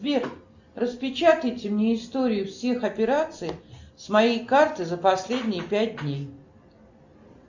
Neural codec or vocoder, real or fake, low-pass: none; real; 7.2 kHz